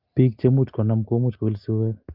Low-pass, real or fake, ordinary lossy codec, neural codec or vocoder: 5.4 kHz; real; Opus, 32 kbps; none